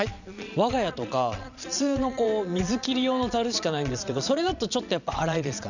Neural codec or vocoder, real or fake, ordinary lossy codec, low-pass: vocoder, 22.05 kHz, 80 mel bands, Vocos; fake; none; 7.2 kHz